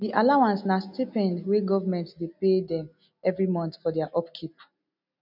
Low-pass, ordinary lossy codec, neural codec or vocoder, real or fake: 5.4 kHz; none; none; real